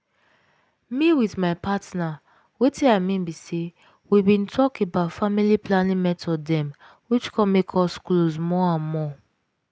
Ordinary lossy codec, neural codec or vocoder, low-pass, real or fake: none; none; none; real